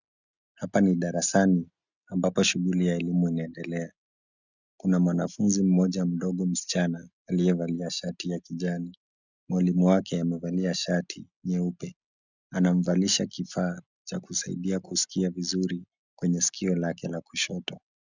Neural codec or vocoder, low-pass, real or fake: none; 7.2 kHz; real